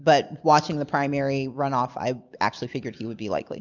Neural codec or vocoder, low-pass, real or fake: none; 7.2 kHz; real